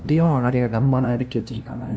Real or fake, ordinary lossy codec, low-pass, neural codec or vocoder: fake; none; none; codec, 16 kHz, 0.5 kbps, FunCodec, trained on LibriTTS, 25 frames a second